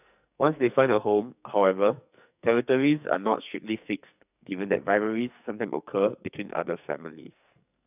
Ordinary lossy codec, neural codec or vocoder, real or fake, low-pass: none; codec, 44.1 kHz, 2.6 kbps, SNAC; fake; 3.6 kHz